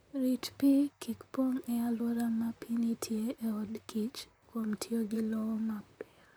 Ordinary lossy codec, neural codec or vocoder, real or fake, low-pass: none; vocoder, 44.1 kHz, 128 mel bands, Pupu-Vocoder; fake; none